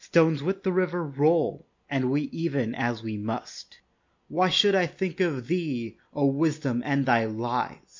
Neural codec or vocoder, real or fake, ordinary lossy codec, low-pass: none; real; MP3, 48 kbps; 7.2 kHz